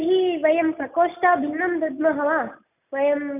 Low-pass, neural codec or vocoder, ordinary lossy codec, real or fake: 3.6 kHz; none; none; real